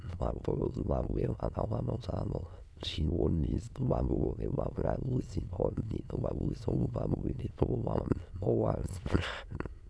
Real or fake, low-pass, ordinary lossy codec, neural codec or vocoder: fake; none; none; autoencoder, 22.05 kHz, a latent of 192 numbers a frame, VITS, trained on many speakers